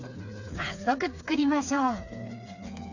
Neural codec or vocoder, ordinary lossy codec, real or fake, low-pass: codec, 16 kHz, 4 kbps, FreqCodec, smaller model; none; fake; 7.2 kHz